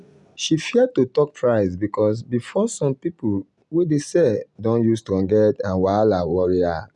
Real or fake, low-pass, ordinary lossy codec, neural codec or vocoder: real; 10.8 kHz; none; none